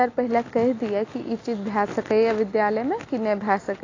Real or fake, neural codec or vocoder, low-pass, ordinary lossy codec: real; none; 7.2 kHz; AAC, 32 kbps